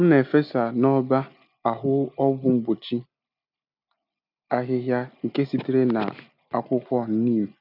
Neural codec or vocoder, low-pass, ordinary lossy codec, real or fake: none; 5.4 kHz; none; real